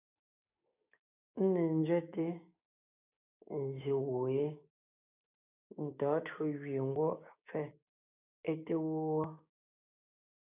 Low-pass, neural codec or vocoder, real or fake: 3.6 kHz; codec, 16 kHz, 6 kbps, DAC; fake